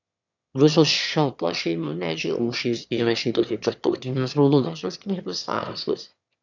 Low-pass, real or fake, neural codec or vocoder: 7.2 kHz; fake; autoencoder, 22.05 kHz, a latent of 192 numbers a frame, VITS, trained on one speaker